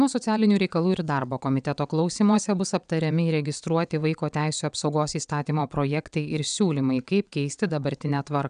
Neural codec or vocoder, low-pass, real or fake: vocoder, 22.05 kHz, 80 mel bands, WaveNeXt; 9.9 kHz; fake